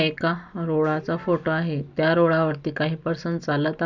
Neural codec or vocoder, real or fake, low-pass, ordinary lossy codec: none; real; 7.2 kHz; none